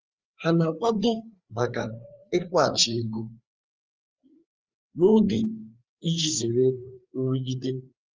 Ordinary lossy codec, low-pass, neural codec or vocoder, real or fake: Opus, 24 kbps; 7.2 kHz; codec, 16 kHz, 4 kbps, FreqCodec, larger model; fake